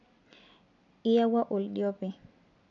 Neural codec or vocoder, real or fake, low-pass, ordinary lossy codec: none; real; 7.2 kHz; none